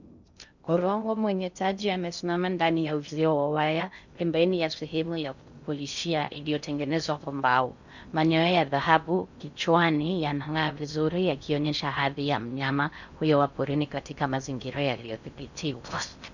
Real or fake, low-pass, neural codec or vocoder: fake; 7.2 kHz; codec, 16 kHz in and 24 kHz out, 0.6 kbps, FocalCodec, streaming, 2048 codes